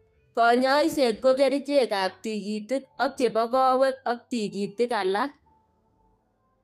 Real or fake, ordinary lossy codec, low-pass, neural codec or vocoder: fake; none; 14.4 kHz; codec, 32 kHz, 1.9 kbps, SNAC